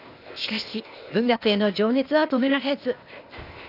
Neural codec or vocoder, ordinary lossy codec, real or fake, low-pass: codec, 16 kHz, 0.8 kbps, ZipCodec; none; fake; 5.4 kHz